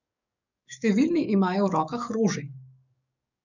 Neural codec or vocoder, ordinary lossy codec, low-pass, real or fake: codec, 44.1 kHz, 7.8 kbps, DAC; none; 7.2 kHz; fake